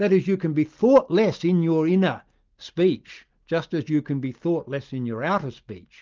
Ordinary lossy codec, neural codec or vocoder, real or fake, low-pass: Opus, 24 kbps; none; real; 7.2 kHz